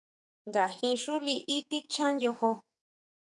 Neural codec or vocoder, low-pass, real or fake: codec, 44.1 kHz, 2.6 kbps, SNAC; 10.8 kHz; fake